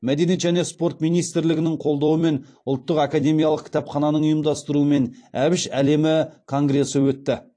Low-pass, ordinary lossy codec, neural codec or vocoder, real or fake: 9.9 kHz; AAC, 48 kbps; vocoder, 44.1 kHz, 128 mel bands every 256 samples, BigVGAN v2; fake